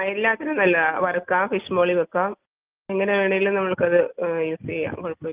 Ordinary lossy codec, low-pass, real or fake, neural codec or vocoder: Opus, 32 kbps; 3.6 kHz; real; none